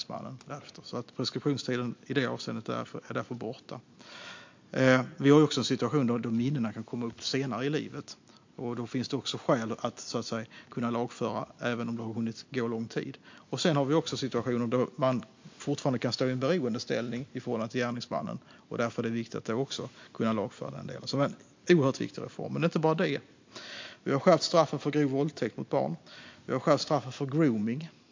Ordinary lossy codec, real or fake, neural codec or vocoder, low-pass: MP3, 48 kbps; real; none; 7.2 kHz